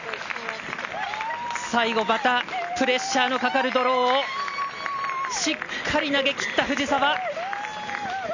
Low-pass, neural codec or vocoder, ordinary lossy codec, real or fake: 7.2 kHz; none; none; real